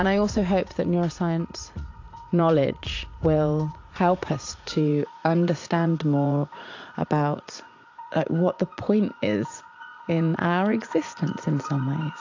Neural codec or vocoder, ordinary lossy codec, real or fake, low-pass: vocoder, 44.1 kHz, 80 mel bands, Vocos; AAC, 48 kbps; fake; 7.2 kHz